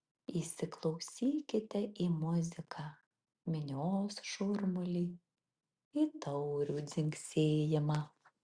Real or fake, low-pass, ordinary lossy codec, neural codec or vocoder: real; 9.9 kHz; Opus, 64 kbps; none